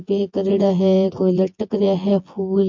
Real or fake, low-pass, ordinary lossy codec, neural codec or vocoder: fake; 7.2 kHz; MP3, 48 kbps; vocoder, 24 kHz, 100 mel bands, Vocos